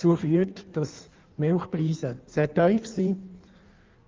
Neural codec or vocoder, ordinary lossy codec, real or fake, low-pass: codec, 16 kHz in and 24 kHz out, 1.1 kbps, FireRedTTS-2 codec; Opus, 32 kbps; fake; 7.2 kHz